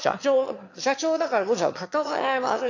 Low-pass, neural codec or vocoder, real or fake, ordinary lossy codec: 7.2 kHz; autoencoder, 22.05 kHz, a latent of 192 numbers a frame, VITS, trained on one speaker; fake; AAC, 48 kbps